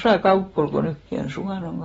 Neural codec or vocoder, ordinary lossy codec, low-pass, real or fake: none; AAC, 24 kbps; 19.8 kHz; real